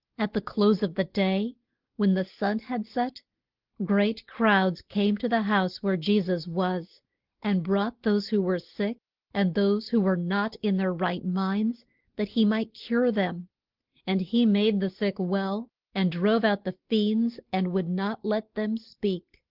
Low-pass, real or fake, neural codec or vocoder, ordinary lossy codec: 5.4 kHz; real; none; Opus, 16 kbps